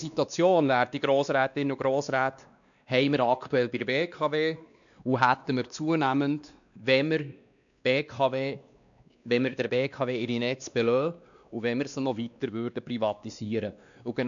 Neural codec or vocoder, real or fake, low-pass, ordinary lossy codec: codec, 16 kHz, 2 kbps, X-Codec, WavLM features, trained on Multilingual LibriSpeech; fake; 7.2 kHz; none